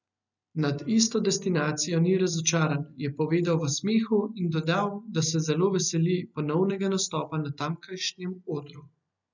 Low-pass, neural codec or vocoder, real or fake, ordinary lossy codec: 7.2 kHz; none; real; none